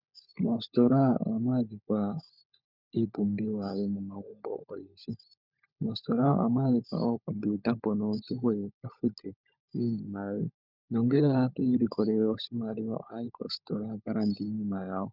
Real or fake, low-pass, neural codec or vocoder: fake; 5.4 kHz; codec, 16 kHz, 16 kbps, FunCodec, trained on LibriTTS, 50 frames a second